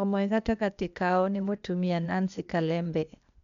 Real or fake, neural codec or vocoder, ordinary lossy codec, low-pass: fake; codec, 16 kHz, 0.8 kbps, ZipCodec; none; 7.2 kHz